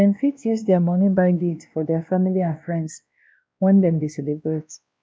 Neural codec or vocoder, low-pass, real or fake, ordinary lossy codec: codec, 16 kHz, 1 kbps, X-Codec, WavLM features, trained on Multilingual LibriSpeech; none; fake; none